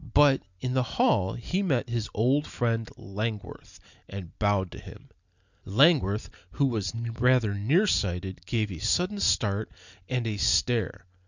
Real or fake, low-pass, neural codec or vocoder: real; 7.2 kHz; none